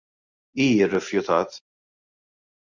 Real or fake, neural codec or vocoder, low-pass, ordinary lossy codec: real; none; 7.2 kHz; Opus, 64 kbps